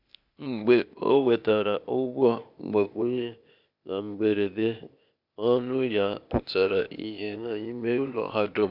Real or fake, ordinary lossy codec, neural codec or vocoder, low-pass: fake; none; codec, 16 kHz, 0.8 kbps, ZipCodec; 5.4 kHz